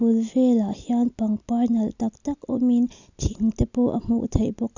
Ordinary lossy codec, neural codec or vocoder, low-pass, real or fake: none; none; 7.2 kHz; real